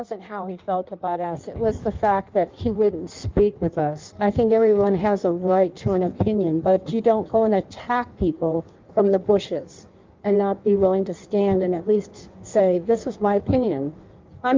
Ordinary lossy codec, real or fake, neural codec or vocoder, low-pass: Opus, 24 kbps; fake; codec, 16 kHz in and 24 kHz out, 1.1 kbps, FireRedTTS-2 codec; 7.2 kHz